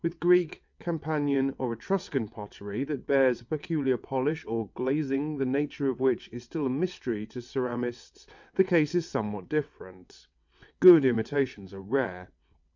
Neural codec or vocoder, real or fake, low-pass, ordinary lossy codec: vocoder, 22.05 kHz, 80 mel bands, WaveNeXt; fake; 7.2 kHz; MP3, 64 kbps